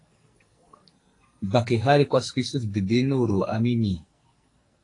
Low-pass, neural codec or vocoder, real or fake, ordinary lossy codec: 10.8 kHz; codec, 44.1 kHz, 2.6 kbps, SNAC; fake; AAC, 48 kbps